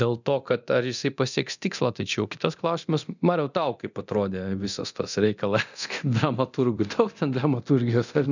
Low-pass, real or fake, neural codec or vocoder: 7.2 kHz; fake; codec, 24 kHz, 0.9 kbps, DualCodec